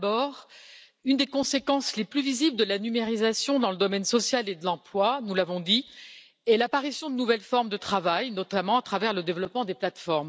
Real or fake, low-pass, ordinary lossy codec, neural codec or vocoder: real; none; none; none